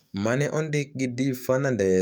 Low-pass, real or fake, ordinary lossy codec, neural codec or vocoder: none; fake; none; codec, 44.1 kHz, 7.8 kbps, DAC